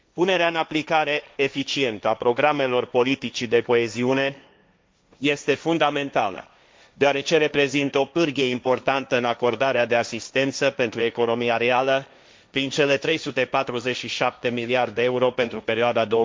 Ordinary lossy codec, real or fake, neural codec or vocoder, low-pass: none; fake; codec, 16 kHz, 1.1 kbps, Voila-Tokenizer; none